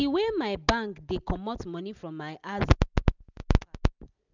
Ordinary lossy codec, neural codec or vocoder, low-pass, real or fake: none; none; 7.2 kHz; real